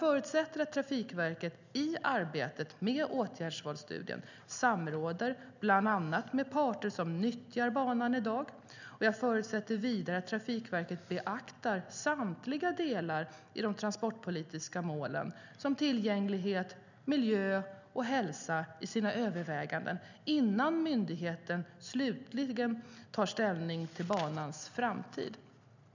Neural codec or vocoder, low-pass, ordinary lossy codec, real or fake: none; 7.2 kHz; none; real